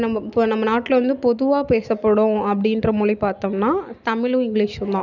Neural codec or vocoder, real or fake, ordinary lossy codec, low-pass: none; real; none; 7.2 kHz